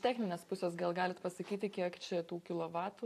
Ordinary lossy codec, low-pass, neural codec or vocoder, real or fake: AAC, 64 kbps; 14.4 kHz; vocoder, 44.1 kHz, 128 mel bands every 512 samples, BigVGAN v2; fake